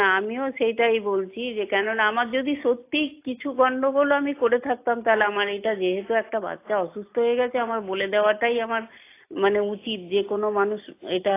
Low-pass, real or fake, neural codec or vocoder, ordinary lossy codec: 3.6 kHz; real; none; AAC, 24 kbps